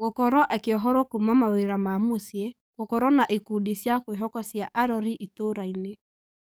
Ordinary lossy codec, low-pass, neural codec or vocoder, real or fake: none; none; codec, 44.1 kHz, 7.8 kbps, DAC; fake